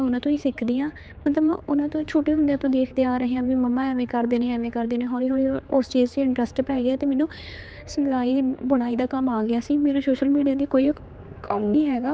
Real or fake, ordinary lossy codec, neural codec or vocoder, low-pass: fake; none; codec, 16 kHz, 4 kbps, X-Codec, HuBERT features, trained on general audio; none